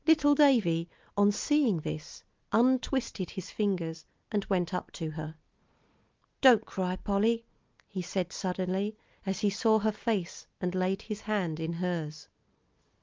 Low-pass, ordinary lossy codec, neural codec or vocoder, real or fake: 7.2 kHz; Opus, 24 kbps; none; real